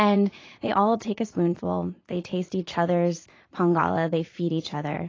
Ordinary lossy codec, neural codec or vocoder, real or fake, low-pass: AAC, 32 kbps; vocoder, 44.1 kHz, 80 mel bands, Vocos; fake; 7.2 kHz